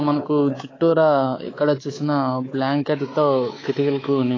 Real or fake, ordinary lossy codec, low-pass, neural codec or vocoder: fake; AAC, 32 kbps; 7.2 kHz; codec, 24 kHz, 3.1 kbps, DualCodec